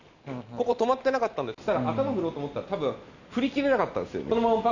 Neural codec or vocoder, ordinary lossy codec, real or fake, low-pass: none; none; real; 7.2 kHz